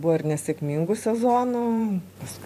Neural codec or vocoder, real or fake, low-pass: vocoder, 44.1 kHz, 128 mel bands every 512 samples, BigVGAN v2; fake; 14.4 kHz